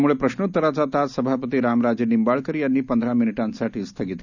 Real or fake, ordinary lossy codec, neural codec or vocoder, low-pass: real; none; none; 7.2 kHz